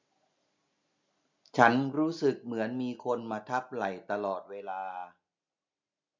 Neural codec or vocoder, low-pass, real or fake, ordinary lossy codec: none; 7.2 kHz; real; MP3, 64 kbps